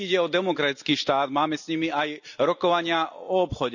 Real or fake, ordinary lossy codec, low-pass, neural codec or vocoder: real; none; 7.2 kHz; none